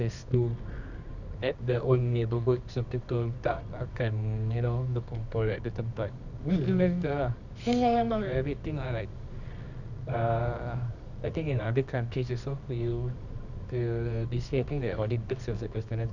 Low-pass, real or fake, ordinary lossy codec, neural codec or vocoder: 7.2 kHz; fake; none; codec, 24 kHz, 0.9 kbps, WavTokenizer, medium music audio release